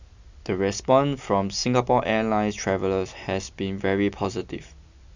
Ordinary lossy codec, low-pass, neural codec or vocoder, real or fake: Opus, 64 kbps; 7.2 kHz; none; real